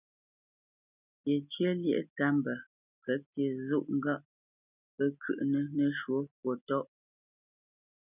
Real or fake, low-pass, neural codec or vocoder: real; 3.6 kHz; none